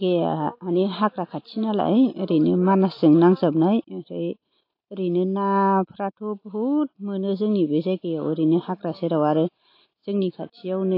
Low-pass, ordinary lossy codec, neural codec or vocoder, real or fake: 5.4 kHz; AAC, 32 kbps; none; real